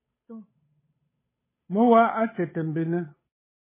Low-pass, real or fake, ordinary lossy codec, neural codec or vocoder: 3.6 kHz; fake; MP3, 16 kbps; codec, 16 kHz, 8 kbps, FunCodec, trained on Chinese and English, 25 frames a second